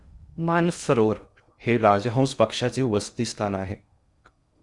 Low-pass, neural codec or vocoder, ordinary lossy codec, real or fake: 10.8 kHz; codec, 16 kHz in and 24 kHz out, 0.6 kbps, FocalCodec, streaming, 2048 codes; Opus, 64 kbps; fake